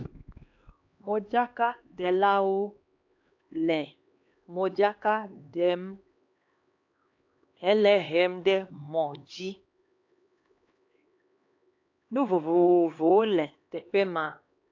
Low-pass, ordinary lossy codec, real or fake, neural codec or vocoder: 7.2 kHz; AAC, 48 kbps; fake; codec, 16 kHz, 2 kbps, X-Codec, HuBERT features, trained on LibriSpeech